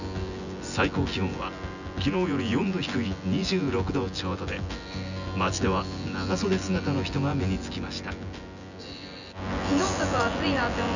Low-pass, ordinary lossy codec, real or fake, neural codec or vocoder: 7.2 kHz; none; fake; vocoder, 24 kHz, 100 mel bands, Vocos